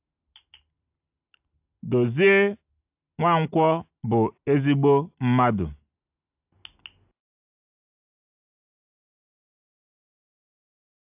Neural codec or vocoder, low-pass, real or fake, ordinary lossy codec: none; 3.6 kHz; real; AAC, 32 kbps